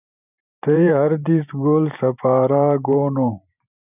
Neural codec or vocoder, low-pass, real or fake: vocoder, 44.1 kHz, 128 mel bands every 512 samples, BigVGAN v2; 3.6 kHz; fake